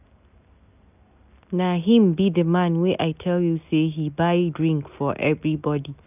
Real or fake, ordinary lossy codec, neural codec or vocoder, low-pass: real; none; none; 3.6 kHz